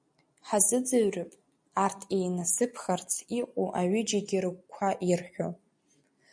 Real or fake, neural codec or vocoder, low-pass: real; none; 9.9 kHz